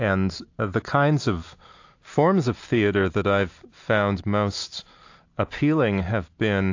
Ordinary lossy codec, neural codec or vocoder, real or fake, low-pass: AAC, 48 kbps; none; real; 7.2 kHz